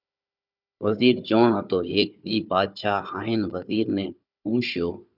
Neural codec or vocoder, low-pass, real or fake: codec, 16 kHz, 4 kbps, FunCodec, trained on Chinese and English, 50 frames a second; 5.4 kHz; fake